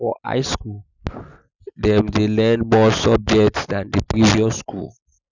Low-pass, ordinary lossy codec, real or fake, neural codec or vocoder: 7.2 kHz; none; real; none